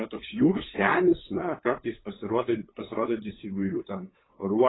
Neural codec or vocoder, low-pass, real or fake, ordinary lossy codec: codec, 16 kHz, 8 kbps, FunCodec, trained on LibriTTS, 25 frames a second; 7.2 kHz; fake; AAC, 16 kbps